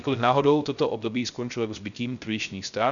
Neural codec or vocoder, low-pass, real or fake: codec, 16 kHz, 0.3 kbps, FocalCodec; 7.2 kHz; fake